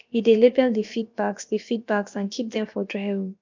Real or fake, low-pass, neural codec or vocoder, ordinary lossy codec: fake; 7.2 kHz; codec, 16 kHz, about 1 kbps, DyCAST, with the encoder's durations; MP3, 64 kbps